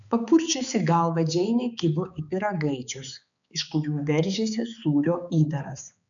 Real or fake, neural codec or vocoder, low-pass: fake; codec, 16 kHz, 4 kbps, X-Codec, HuBERT features, trained on balanced general audio; 7.2 kHz